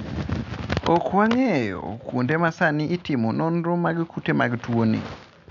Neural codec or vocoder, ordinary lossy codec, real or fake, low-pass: none; none; real; 7.2 kHz